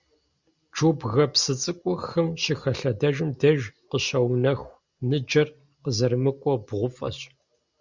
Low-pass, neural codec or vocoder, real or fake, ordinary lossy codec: 7.2 kHz; none; real; Opus, 64 kbps